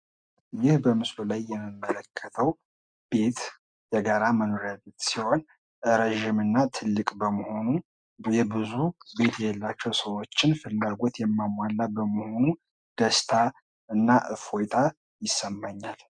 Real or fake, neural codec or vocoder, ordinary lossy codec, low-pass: real; none; MP3, 96 kbps; 9.9 kHz